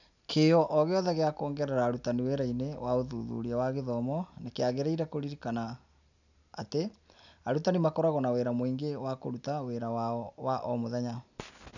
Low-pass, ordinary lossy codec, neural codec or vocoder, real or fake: 7.2 kHz; none; none; real